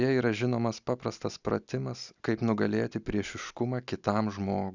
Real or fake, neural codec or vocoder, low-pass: real; none; 7.2 kHz